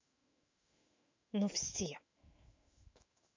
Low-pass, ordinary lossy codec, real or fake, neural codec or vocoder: 7.2 kHz; MP3, 64 kbps; fake; autoencoder, 48 kHz, 128 numbers a frame, DAC-VAE, trained on Japanese speech